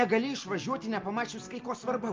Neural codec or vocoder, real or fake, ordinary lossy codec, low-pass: none; real; Opus, 32 kbps; 7.2 kHz